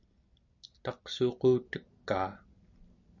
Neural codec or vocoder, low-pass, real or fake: none; 7.2 kHz; real